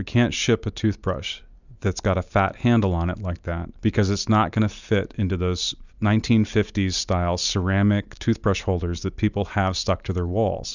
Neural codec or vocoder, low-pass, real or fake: none; 7.2 kHz; real